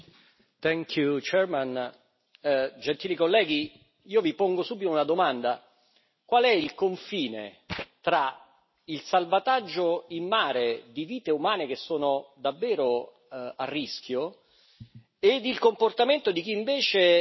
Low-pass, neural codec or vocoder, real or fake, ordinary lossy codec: 7.2 kHz; none; real; MP3, 24 kbps